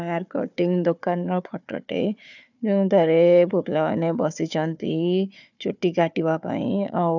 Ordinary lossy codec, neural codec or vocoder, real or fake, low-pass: none; codec, 16 kHz, 4 kbps, FunCodec, trained on Chinese and English, 50 frames a second; fake; 7.2 kHz